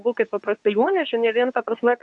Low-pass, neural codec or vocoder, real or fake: 10.8 kHz; codec, 24 kHz, 0.9 kbps, WavTokenizer, medium speech release version 2; fake